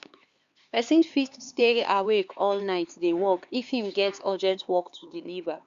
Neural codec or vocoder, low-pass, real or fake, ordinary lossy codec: codec, 16 kHz, 4 kbps, X-Codec, HuBERT features, trained on LibriSpeech; 7.2 kHz; fake; Opus, 64 kbps